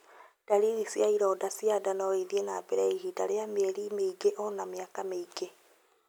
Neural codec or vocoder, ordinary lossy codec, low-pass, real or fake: none; none; none; real